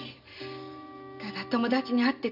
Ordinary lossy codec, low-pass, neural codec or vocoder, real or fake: none; 5.4 kHz; none; real